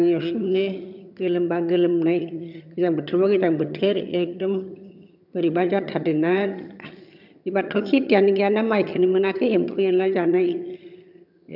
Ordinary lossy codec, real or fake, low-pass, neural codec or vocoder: none; fake; 5.4 kHz; vocoder, 22.05 kHz, 80 mel bands, HiFi-GAN